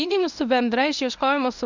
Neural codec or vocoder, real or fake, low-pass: codec, 24 kHz, 0.9 kbps, WavTokenizer, medium speech release version 1; fake; 7.2 kHz